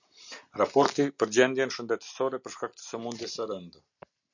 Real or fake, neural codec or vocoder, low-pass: real; none; 7.2 kHz